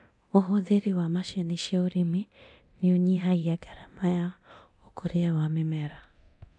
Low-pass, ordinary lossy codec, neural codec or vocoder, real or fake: 10.8 kHz; none; codec, 24 kHz, 0.9 kbps, DualCodec; fake